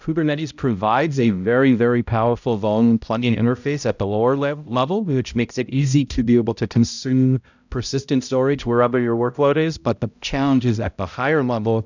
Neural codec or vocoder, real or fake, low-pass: codec, 16 kHz, 0.5 kbps, X-Codec, HuBERT features, trained on balanced general audio; fake; 7.2 kHz